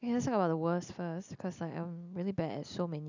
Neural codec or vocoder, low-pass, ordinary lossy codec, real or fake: none; 7.2 kHz; none; real